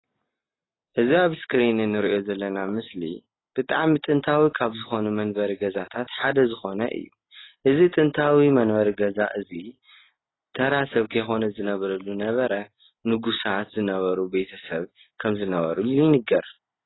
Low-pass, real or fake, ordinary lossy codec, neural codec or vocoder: 7.2 kHz; real; AAC, 16 kbps; none